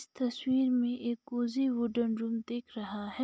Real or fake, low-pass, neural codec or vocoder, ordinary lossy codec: real; none; none; none